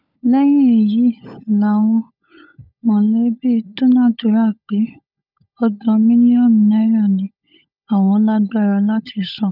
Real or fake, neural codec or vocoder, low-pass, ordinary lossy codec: fake; codec, 16 kHz, 16 kbps, FunCodec, trained on LibriTTS, 50 frames a second; 5.4 kHz; none